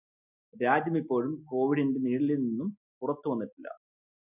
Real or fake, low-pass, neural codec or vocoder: real; 3.6 kHz; none